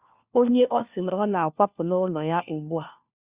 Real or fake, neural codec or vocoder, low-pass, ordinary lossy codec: fake; codec, 16 kHz, 1 kbps, FunCodec, trained on LibriTTS, 50 frames a second; 3.6 kHz; Opus, 64 kbps